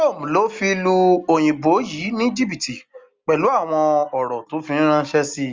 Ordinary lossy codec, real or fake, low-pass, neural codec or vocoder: Opus, 32 kbps; real; 7.2 kHz; none